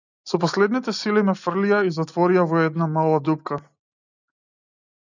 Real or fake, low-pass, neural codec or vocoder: real; 7.2 kHz; none